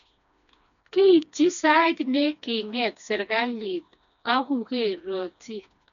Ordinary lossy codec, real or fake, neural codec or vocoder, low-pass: none; fake; codec, 16 kHz, 2 kbps, FreqCodec, smaller model; 7.2 kHz